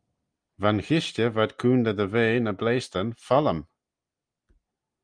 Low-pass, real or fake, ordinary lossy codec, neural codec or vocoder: 9.9 kHz; real; Opus, 32 kbps; none